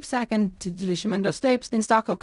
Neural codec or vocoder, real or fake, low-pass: codec, 16 kHz in and 24 kHz out, 0.4 kbps, LongCat-Audio-Codec, fine tuned four codebook decoder; fake; 10.8 kHz